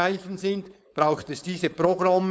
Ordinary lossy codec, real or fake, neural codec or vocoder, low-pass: none; fake; codec, 16 kHz, 4.8 kbps, FACodec; none